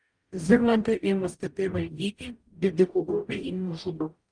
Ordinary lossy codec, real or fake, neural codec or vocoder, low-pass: Opus, 32 kbps; fake; codec, 44.1 kHz, 0.9 kbps, DAC; 9.9 kHz